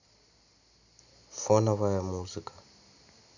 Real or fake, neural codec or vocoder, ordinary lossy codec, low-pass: real; none; none; 7.2 kHz